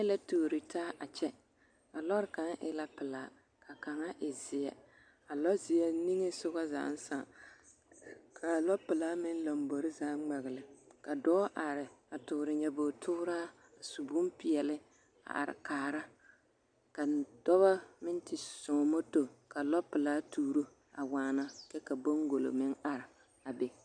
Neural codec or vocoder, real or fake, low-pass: none; real; 9.9 kHz